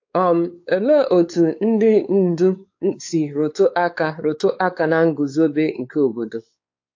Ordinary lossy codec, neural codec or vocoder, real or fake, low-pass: AAC, 48 kbps; codec, 16 kHz, 4 kbps, X-Codec, WavLM features, trained on Multilingual LibriSpeech; fake; 7.2 kHz